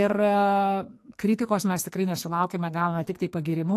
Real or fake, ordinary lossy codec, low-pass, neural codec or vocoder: fake; AAC, 64 kbps; 14.4 kHz; codec, 44.1 kHz, 2.6 kbps, SNAC